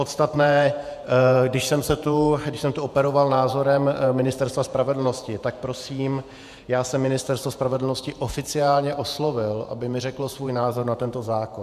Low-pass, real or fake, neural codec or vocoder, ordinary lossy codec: 14.4 kHz; fake; vocoder, 48 kHz, 128 mel bands, Vocos; MP3, 96 kbps